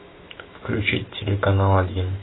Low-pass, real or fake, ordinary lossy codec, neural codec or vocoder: 7.2 kHz; fake; AAC, 16 kbps; autoencoder, 48 kHz, 128 numbers a frame, DAC-VAE, trained on Japanese speech